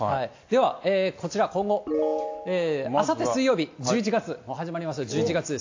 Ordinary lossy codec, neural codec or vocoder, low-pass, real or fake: AAC, 48 kbps; none; 7.2 kHz; real